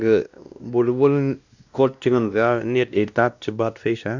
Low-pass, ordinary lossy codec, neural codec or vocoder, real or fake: 7.2 kHz; none; codec, 16 kHz, 1 kbps, X-Codec, WavLM features, trained on Multilingual LibriSpeech; fake